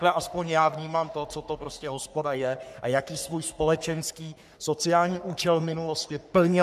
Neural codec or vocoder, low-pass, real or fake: codec, 44.1 kHz, 3.4 kbps, Pupu-Codec; 14.4 kHz; fake